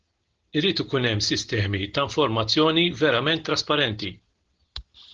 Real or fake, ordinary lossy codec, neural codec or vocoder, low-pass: real; Opus, 16 kbps; none; 7.2 kHz